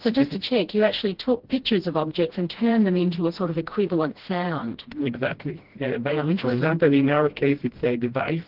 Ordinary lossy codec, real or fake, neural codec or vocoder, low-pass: Opus, 16 kbps; fake; codec, 16 kHz, 1 kbps, FreqCodec, smaller model; 5.4 kHz